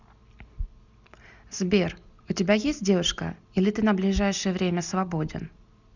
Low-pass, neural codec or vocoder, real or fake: 7.2 kHz; none; real